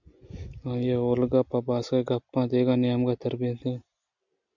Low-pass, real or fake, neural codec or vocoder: 7.2 kHz; real; none